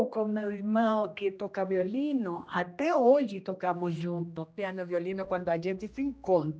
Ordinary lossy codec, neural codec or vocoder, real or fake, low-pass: none; codec, 16 kHz, 1 kbps, X-Codec, HuBERT features, trained on general audio; fake; none